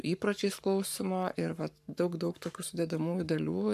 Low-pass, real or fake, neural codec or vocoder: 14.4 kHz; fake; codec, 44.1 kHz, 7.8 kbps, Pupu-Codec